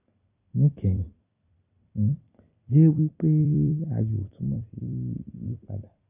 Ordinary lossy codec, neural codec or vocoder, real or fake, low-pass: none; vocoder, 44.1 kHz, 128 mel bands every 256 samples, BigVGAN v2; fake; 3.6 kHz